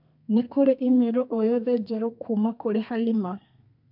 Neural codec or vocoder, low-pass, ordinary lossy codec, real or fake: codec, 32 kHz, 1.9 kbps, SNAC; 5.4 kHz; none; fake